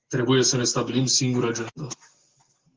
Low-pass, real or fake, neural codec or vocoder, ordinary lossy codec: 7.2 kHz; real; none; Opus, 16 kbps